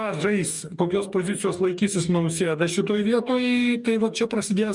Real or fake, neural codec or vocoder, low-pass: fake; codec, 44.1 kHz, 2.6 kbps, DAC; 10.8 kHz